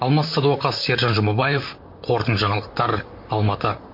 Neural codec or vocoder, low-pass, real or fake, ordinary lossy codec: vocoder, 44.1 kHz, 128 mel bands, Pupu-Vocoder; 5.4 kHz; fake; MP3, 32 kbps